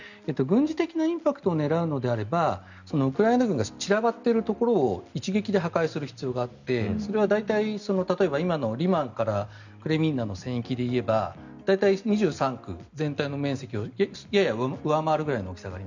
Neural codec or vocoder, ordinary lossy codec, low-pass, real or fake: none; none; 7.2 kHz; real